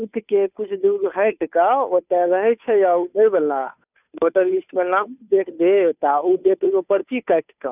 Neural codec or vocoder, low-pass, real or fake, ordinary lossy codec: codec, 16 kHz, 2 kbps, FunCodec, trained on Chinese and English, 25 frames a second; 3.6 kHz; fake; none